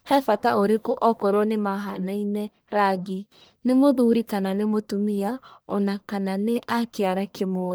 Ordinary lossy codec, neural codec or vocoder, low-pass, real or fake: none; codec, 44.1 kHz, 1.7 kbps, Pupu-Codec; none; fake